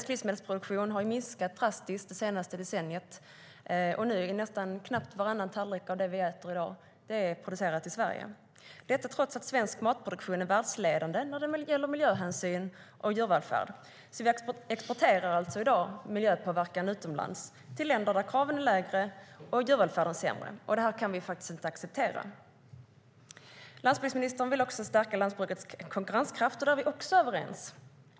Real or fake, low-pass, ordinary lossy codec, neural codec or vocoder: real; none; none; none